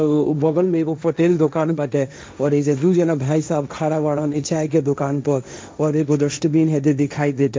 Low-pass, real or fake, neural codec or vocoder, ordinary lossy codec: none; fake; codec, 16 kHz, 1.1 kbps, Voila-Tokenizer; none